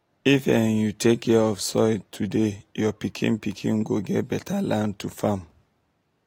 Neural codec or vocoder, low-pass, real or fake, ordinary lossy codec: none; 19.8 kHz; real; AAC, 48 kbps